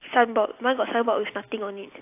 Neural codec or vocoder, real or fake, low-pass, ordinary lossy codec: none; real; 3.6 kHz; AAC, 24 kbps